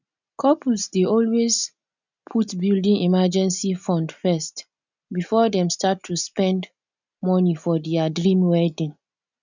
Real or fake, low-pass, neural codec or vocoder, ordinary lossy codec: real; 7.2 kHz; none; none